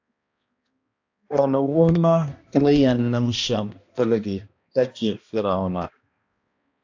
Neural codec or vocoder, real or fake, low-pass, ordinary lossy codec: codec, 16 kHz, 1 kbps, X-Codec, HuBERT features, trained on balanced general audio; fake; 7.2 kHz; AAC, 48 kbps